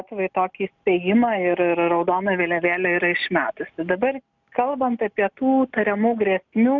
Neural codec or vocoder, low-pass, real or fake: none; 7.2 kHz; real